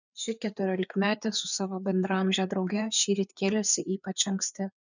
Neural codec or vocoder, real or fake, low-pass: codec, 16 kHz, 4 kbps, FreqCodec, larger model; fake; 7.2 kHz